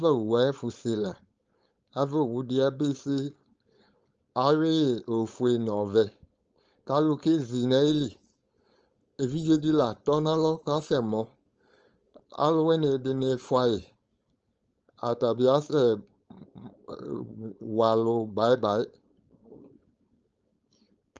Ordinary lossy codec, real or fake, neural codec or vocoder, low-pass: Opus, 24 kbps; fake; codec, 16 kHz, 4.8 kbps, FACodec; 7.2 kHz